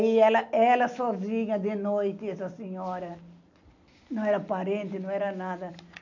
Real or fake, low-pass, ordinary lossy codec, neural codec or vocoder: real; 7.2 kHz; none; none